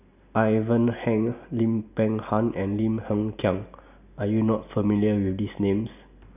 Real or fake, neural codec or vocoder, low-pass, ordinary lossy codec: real; none; 3.6 kHz; none